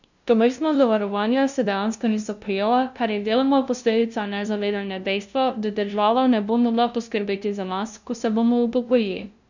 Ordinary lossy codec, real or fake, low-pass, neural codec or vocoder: none; fake; 7.2 kHz; codec, 16 kHz, 0.5 kbps, FunCodec, trained on LibriTTS, 25 frames a second